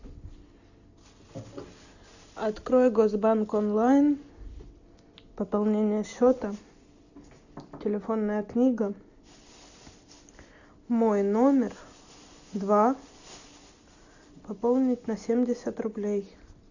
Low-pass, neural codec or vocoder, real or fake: 7.2 kHz; none; real